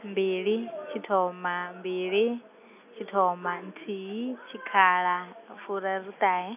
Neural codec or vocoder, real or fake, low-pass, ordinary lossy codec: none; real; 3.6 kHz; none